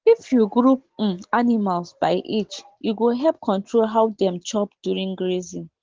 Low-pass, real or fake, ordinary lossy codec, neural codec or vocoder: 7.2 kHz; real; Opus, 16 kbps; none